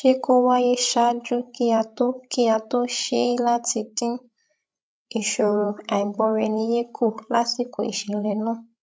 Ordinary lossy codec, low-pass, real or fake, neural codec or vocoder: none; none; fake; codec, 16 kHz, 16 kbps, FreqCodec, larger model